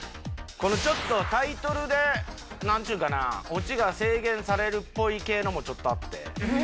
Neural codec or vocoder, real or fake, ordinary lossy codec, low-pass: none; real; none; none